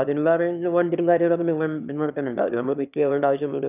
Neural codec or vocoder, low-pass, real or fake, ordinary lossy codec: autoencoder, 22.05 kHz, a latent of 192 numbers a frame, VITS, trained on one speaker; 3.6 kHz; fake; none